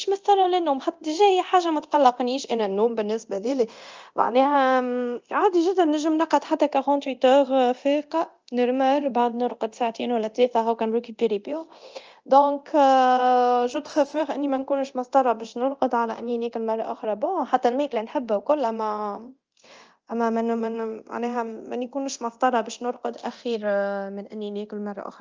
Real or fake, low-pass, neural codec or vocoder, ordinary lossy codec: fake; 7.2 kHz; codec, 24 kHz, 0.9 kbps, DualCodec; Opus, 24 kbps